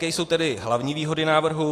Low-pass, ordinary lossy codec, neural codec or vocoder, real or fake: 14.4 kHz; AAC, 48 kbps; none; real